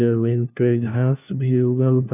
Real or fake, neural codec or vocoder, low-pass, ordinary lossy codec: fake; codec, 16 kHz, 1 kbps, FunCodec, trained on LibriTTS, 50 frames a second; 3.6 kHz; none